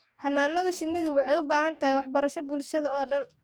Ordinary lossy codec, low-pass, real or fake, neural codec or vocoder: none; none; fake; codec, 44.1 kHz, 2.6 kbps, DAC